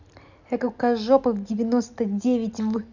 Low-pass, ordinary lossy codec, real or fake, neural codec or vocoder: 7.2 kHz; none; real; none